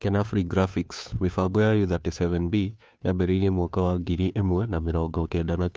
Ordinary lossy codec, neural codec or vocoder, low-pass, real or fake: none; codec, 16 kHz, 2 kbps, FunCodec, trained on Chinese and English, 25 frames a second; none; fake